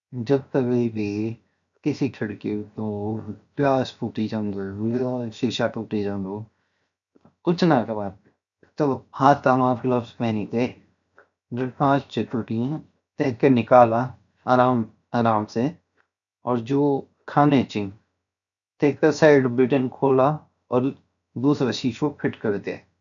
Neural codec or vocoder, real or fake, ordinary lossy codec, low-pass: codec, 16 kHz, 0.7 kbps, FocalCodec; fake; none; 7.2 kHz